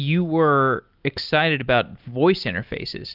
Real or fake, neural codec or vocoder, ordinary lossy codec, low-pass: real; none; Opus, 32 kbps; 5.4 kHz